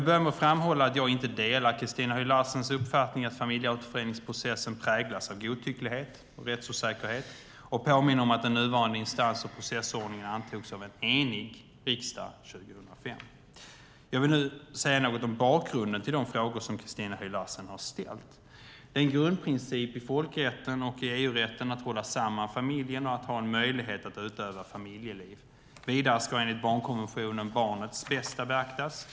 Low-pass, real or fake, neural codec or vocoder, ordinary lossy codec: none; real; none; none